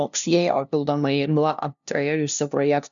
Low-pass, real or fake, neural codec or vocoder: 7.2 kHz; fake; codec, 16 kHz, 0.5 kbps, FunCodec, trained on LibriTTS, 25 frames a second